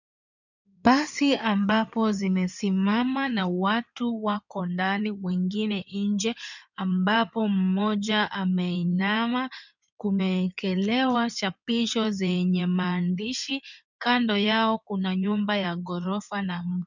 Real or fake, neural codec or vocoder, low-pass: fake; codec, 16 kHz in and 24 kHz out, 2.2 kbps, FireRedTTS-2 codec; 7.2 kHz